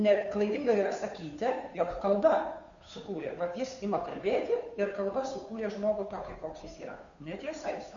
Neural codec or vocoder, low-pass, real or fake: codec, 16 kHz, 2 kbps, FunCodec, trained on Chinese and English, 25 frames a second; 7.2 kHz; fake